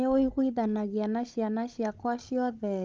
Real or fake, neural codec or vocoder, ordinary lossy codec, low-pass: fake; codec, 16 kHz, 16 kbps, FunCodec, trained on Chinese and English, 50 frames a second; Opus, 32 kbps; 7.2 kHz